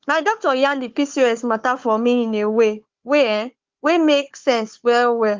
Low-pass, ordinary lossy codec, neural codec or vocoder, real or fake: 7.2 kHz; Opus, 32 kbps; codec, 16 kHz, 4 kbps, FunCodec, trained on Chinese and English, 50 frames a second; fake